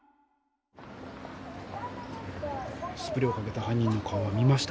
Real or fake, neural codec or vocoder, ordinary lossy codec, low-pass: real; none; none; none